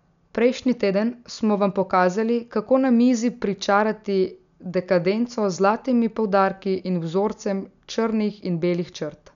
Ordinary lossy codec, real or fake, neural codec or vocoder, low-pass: none; real; none; 7.2 kHz